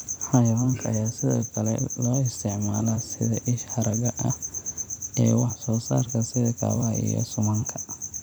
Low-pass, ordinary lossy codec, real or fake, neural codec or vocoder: none; none; fake; vocoder, 44.1 kHz, 128 mel bands every 256 samples, BigVGAN v2